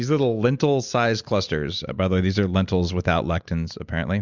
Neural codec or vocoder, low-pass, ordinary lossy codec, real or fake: none; 7.2 kHz; Opus, 64 kbps; real